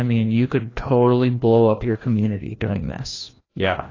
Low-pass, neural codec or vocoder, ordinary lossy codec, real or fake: 7.2 kHz; codec, 16 kHz, 1 kbps, FreqCodec, larger model; AAC, 32 kbps; fake